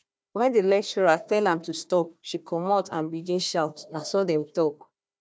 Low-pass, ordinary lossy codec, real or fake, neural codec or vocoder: none; none; fake; codec, 16 kHz, 1 kbps, FunCodec, trained on Chinese and English, 50 frames a second